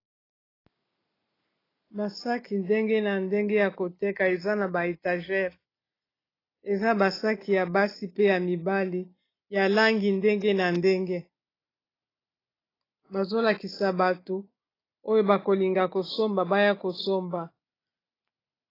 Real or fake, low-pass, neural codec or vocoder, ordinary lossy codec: real; 5.4 kHz; none; AAC, 24 kbps